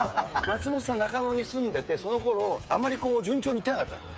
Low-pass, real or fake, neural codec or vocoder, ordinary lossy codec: none; fake; codec, 16 kHz, 8 kbps, FreqCodec, smaller model; none